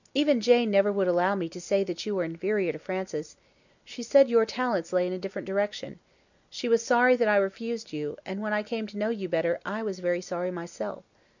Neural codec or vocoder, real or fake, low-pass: none; real; 7.2 kHz